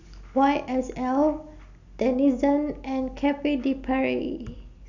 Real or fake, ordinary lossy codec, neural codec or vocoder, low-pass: real; none; none; 7.2 kHz